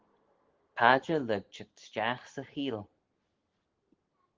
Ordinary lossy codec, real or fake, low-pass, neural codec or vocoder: Opus, 16 kbps; real; 7.2 kHz; none